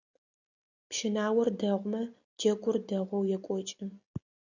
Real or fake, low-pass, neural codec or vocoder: real; 7.2 kHz; none